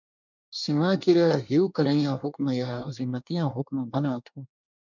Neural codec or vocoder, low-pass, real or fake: codec, 24 kHz, 1 kbps, SNAC; 7.2 kHz; fake